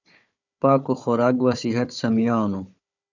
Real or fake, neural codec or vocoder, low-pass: fake; codec, 16 kHz, 4 kbps, FunCodec, trained on Chinese and English, 50 frames a second; 7.2 kHz